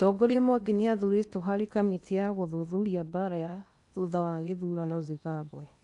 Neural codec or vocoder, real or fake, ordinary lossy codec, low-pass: codec, 16 kHz in and 24 kHz out, 0.8 kbps, FocalCodec, streaming, 65536 codes; fake; none; 10.8 kHz